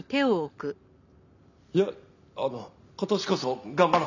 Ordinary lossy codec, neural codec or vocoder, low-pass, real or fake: none; none; 7.2 kHz; real